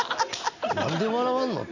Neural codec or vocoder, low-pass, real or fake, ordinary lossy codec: none; 7.2 kHz; real; none